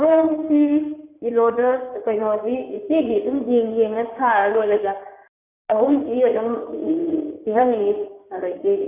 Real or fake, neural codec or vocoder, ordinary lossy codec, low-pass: fake; codec, 16 kHz in and 24 kHz out, 2.2 kbps, FireRedTTS-2 codec; none; 3.6 kHz